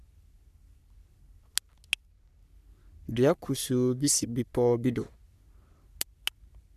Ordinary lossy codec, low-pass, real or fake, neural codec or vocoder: none; 14.4 kHz; fake; codec, 44.1 kHz, 3.4 kbps, Pupu-Codec